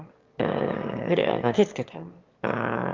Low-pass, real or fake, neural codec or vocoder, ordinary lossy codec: 7.2 kHz; fake; autoencoder, 22.05 kHz, a latent of 192 numbers a frame, VITS, trained on one speaker; Opus, 24 kbps